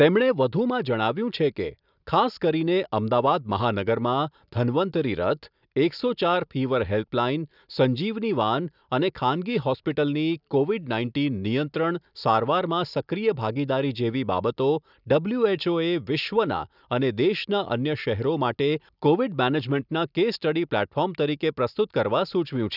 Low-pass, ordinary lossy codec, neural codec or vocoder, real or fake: 5.4 kHz; none; none; real